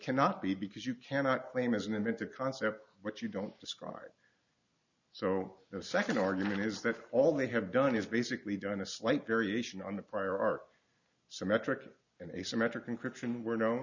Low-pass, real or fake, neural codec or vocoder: 7.2 kHz; real; none